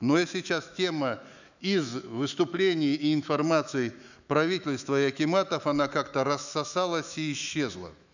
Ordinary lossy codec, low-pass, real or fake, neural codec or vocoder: none; 7.2 kHz; fake; autoencoder, 48 kHz, 128 numbers a frame, DAC-VAE, trained on Japanese speech